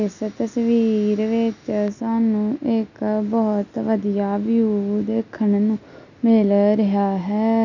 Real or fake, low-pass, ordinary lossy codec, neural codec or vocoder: real; 7.2 kHz; none; none